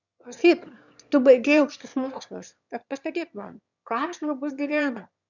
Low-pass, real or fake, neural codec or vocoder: 7.2 kHz; fake; autoencoder, 22.05 kHz, a latent of 192 numbers a frame, VITS, trained on one speaker